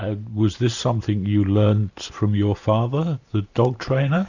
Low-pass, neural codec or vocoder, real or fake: 7.2 kHz; vocoder, 44.1 kHz, 128 mel bands every 512 samples, BigVGAN v2; fake